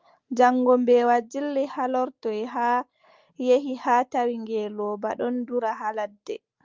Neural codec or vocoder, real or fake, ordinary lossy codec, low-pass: none; real; Opus, 24 kbps; 7.2 kHz